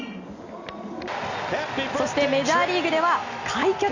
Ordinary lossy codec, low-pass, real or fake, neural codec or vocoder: Opus, 64 kbps; 7.2 kHz; real; none